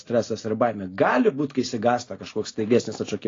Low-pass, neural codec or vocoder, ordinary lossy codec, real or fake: 7.2 kHz; none; AAC, 32 kbps; real